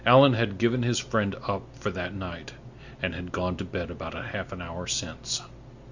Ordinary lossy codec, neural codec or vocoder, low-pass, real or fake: Opus, 64 kbps; none; 7.2 kHz; real